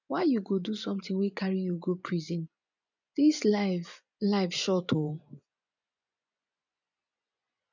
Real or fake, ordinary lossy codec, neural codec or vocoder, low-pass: fake; none; vocoder, 24 kHz, 100 mel bands, Vocos; 7.2 kHz